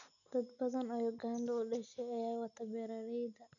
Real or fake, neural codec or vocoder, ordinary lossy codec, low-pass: real; none; none; 7.2 kHz